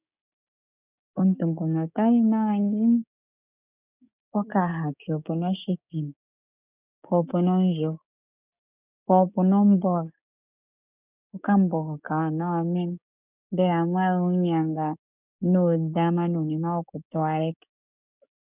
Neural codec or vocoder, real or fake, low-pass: codec, 16 kHz, 6 kbps, DAC; fake; 3.6 kHz